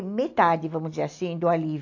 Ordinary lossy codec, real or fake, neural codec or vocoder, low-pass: AAC, 48 kbps; real; none; 7.2 kHz